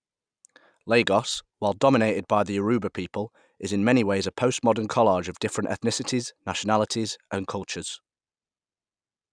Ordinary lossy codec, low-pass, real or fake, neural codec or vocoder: none; 9.9 kHz; real; none